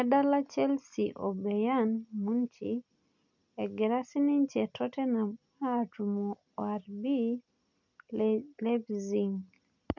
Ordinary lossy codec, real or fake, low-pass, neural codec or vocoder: none; real; 7.2 kHz; none